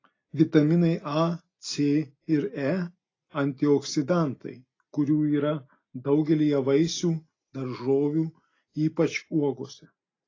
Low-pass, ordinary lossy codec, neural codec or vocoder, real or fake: 7.2 kHz; AAC, 32 kbps; none; real